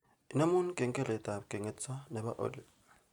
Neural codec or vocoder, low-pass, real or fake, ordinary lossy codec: none; 19.8 kHz; real; none